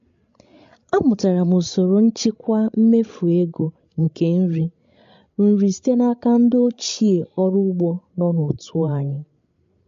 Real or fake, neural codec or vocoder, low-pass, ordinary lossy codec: fake; codec, 16 kHz, 16 kbps, FreqCodec, larger model; 7.2 kHz; MP3, 48 kbps